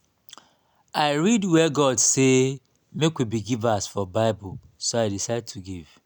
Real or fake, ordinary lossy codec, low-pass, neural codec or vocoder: real; none; 19.8 kHz; none